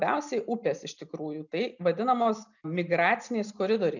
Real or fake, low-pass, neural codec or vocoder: real; 7.2 kHz; none